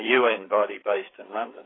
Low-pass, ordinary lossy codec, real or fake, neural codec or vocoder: 7.2 kHz; AAC, 16 kbps; fake; codec, 16 kHz, 4 kbps, FreqCodec, larger model